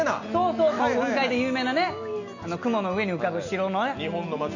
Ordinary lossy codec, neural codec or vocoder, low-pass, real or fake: none; none; 7.2 kHz; real